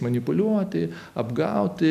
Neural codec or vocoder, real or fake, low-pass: none; real; 14.4 kHz